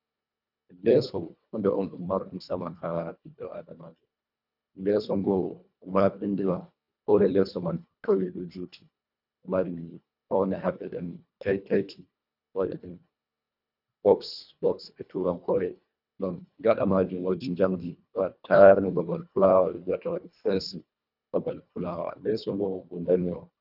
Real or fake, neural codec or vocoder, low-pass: fake; codec, 24 kHz, 1.5 kbps, HILCodec; 5.4 kHz